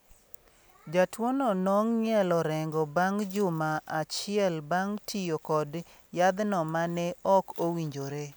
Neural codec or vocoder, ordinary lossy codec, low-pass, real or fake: none; none; none; real